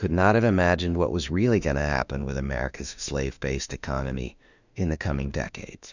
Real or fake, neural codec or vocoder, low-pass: fake; autoencoder, 48 kHz, 32 numbers a frame, DAC-VAE, trained on Japanese speech; 7.2 kHz